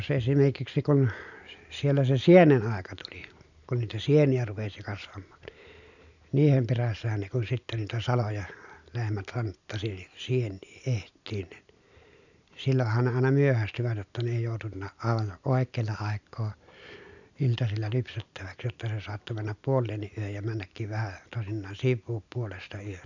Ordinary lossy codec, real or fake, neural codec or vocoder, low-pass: none; real; none; 7.2 kHz